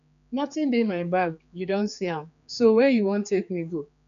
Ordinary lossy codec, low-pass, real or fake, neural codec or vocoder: MP3, 96 kbps; 7.2 kHz; fake; codec, 16 kHz, 4 kbps, X-Codec, HuBERT features, trained on general audio